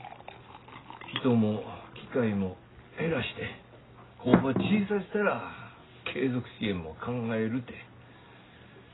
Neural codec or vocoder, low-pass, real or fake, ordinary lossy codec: none; 7.2 kHz; real; AAC, 16 kbps